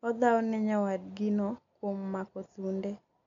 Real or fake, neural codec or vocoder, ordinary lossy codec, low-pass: real; none; MP3, 64 kbps; 7.2 kHz